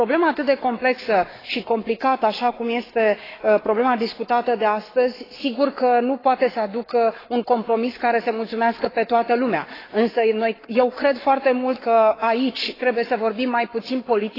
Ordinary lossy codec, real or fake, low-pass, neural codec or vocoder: AAC, 24 kbps; fake; 5.4 kHz; codec, 44.1 kHz, 7.8 kbps, Pupu-Codec